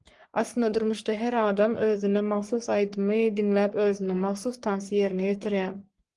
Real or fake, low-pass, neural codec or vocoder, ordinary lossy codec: fake; 10.8 kHz; codec, 44.1 kHz, 3.4 kbps, Pupu-Codec; Opus, 24 kbps